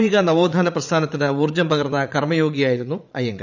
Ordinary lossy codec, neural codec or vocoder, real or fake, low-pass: none; vocoder, 44.1 kHz, 80 mel bands, Vocos; fake; 7.2 kHz